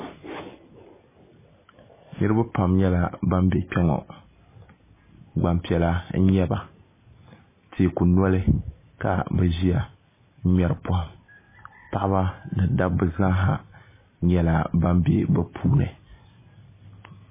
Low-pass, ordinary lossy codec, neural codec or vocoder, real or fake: 3.6 kHz; MP3, 16 kbps; none; real